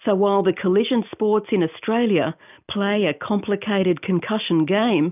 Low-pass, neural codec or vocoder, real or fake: 3.6 kHz; none; real